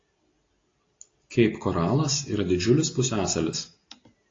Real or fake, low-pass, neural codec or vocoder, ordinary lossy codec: real; 7.2 kHz; none; AAC, 32 kbps